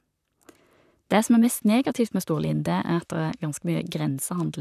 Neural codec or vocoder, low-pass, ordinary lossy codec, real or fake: vocoder, 44.1 kHz, 128 mel bands, Pupu-Vocoder; 14.4 kHz; none; fake